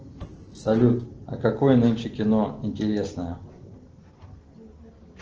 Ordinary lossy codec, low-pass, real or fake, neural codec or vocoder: Opus, 16 kbps; 7.2 kHz; real; none